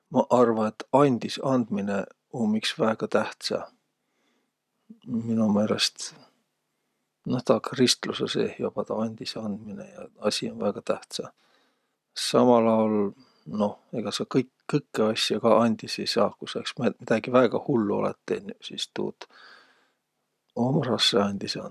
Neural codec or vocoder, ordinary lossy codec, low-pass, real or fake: none; none; 14.4 kHz; real